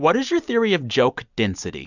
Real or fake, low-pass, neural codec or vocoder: real; 7.2 kHz; none